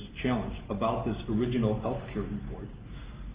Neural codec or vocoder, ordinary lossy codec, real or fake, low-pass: none; Opus, 24 kbps; real; 3.6 kHz